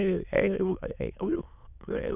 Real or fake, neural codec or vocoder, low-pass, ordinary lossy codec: fake; autoencoder, 22.05 kHz, a latent of 192 numbers a frame, VITS, trained on many speakers; 3.6 kHz; none